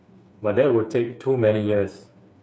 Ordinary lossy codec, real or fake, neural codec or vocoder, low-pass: none; fake; codec, 16 kHz, 4 kbps, FreqCodec, smaller model; none